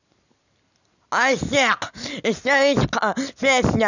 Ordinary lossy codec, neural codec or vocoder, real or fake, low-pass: none; none; real; 7.2 kHz